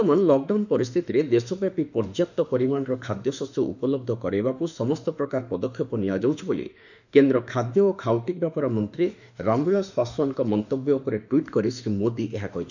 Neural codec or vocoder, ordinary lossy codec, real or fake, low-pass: autoencoder, 48 kHz, 32 numbers a frame, DAC-VAE, trained on Japanese speech; none; fake; 7.2 kHz